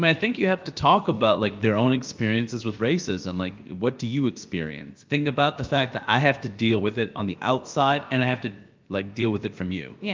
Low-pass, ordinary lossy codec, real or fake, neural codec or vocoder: 7.2 kHz; Opus, 24 kbps; fake; codec, 16 kHz, about 1 kbps, DyCAST, with the encoder's durations